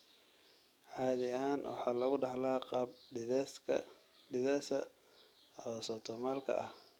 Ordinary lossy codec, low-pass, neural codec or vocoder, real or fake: none; 19.8 kHz; codec, 44.1 kHz, 7.8 kbps, DAC; fake